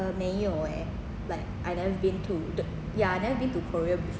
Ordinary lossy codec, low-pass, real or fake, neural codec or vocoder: none; none; real; none